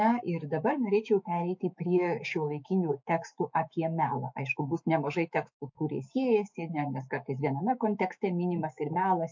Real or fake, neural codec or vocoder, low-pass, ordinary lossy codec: fake; autoencoder, 48 kHz, 128 numbers a frame, DAC-VAE, trained on Japanese speech; 7.2 kHz; MP3, 48 kbps